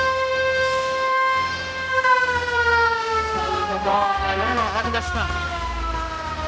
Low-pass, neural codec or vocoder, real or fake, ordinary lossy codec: none; codec, 16 kHz, 1 kbps, X-Codec, HuBERT features, trained on balanced general audio; fake; none